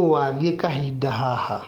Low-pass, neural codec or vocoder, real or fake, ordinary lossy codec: 19.8 kHz; codec, 44.1 kHz, 7.8 kbps, DAC; fake; Opus, 32 kbps